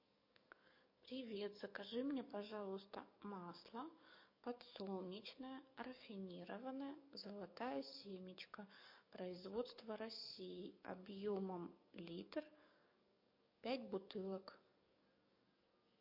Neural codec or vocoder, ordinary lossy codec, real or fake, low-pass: codec, 44.1 kHz, 7.8 kbps, DAC; MP3, 32 kbps; fake; 5.4 kHz